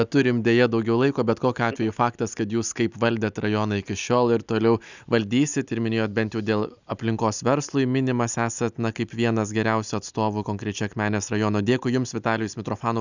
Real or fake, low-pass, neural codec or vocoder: real; 7.2 kHz; none